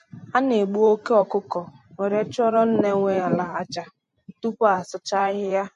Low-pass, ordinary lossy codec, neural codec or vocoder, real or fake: 14.4 kHz; MP3, 48 kbps; vocoder, 44.1 kHz, 128 mel bands every 512 samples, BigVGAN v2; fake